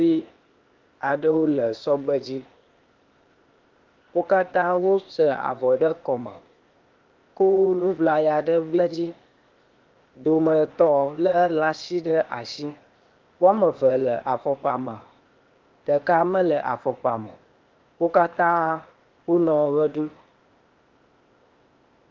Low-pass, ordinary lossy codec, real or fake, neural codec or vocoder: 7.2 kHz; Opus, 32 kbps; fake; codec, 16 kHz, 0.8 kbps, ZipCodec